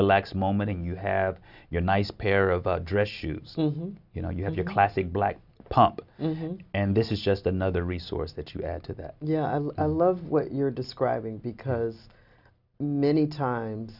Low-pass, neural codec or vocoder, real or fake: 5.4 kHz; none; real